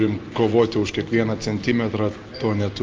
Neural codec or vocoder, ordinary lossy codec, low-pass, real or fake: none; Opus, 32 kbps; 7.2 kHz; real